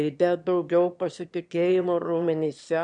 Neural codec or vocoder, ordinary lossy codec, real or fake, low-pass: autoencoder, 22.05 kHz, a latent of 192 numbers a frame, VITS, trained on one speaker; MP3, 64 kbps; fake; 9.9 kHz